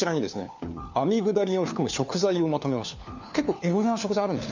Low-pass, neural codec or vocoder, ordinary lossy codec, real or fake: 7.2 kHz; codec, 16 kHz, 4 kbps, FunCodec, trained on LibriTTS, 50 frames a second; none; fake